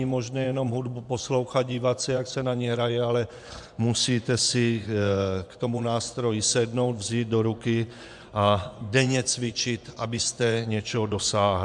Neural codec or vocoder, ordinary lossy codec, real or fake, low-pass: vocoder, 24 kHz, 100 mel bands, Vocos; MP3, 96 kbps; fake; 10.8 kHz